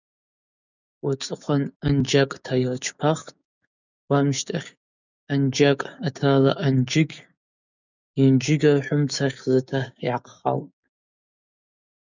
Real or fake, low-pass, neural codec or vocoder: fake; 7.2 kHz; codec, 16 kHz, 6 kbps, DAC